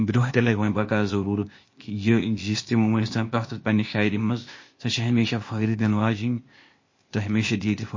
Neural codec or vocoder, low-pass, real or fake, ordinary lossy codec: codec, 16 kHz, 0.8 kbps, ZipCodec; 7.2 kHz; fake; MP3, 32 kbps